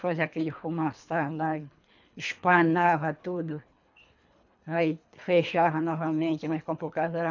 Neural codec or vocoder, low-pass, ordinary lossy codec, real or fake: codec, 24 kHz, 3 kbps, HILCodec; 7.2 kHz; none; fake